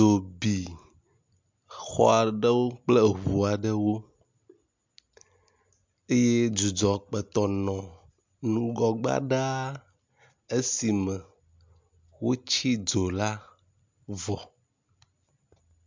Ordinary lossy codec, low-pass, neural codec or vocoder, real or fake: MP3, 64 kbps; 7.2 kHz; none; real